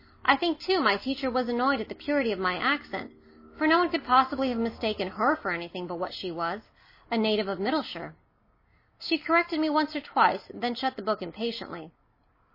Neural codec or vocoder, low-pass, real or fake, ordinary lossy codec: none; 5.4 kHz; real; MP3, 24 kbps